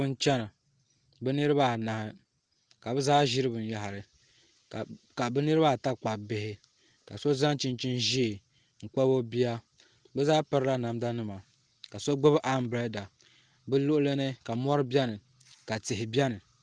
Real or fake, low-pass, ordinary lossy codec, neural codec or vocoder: real; 9.9 kHz; Opus, 24 kbps; none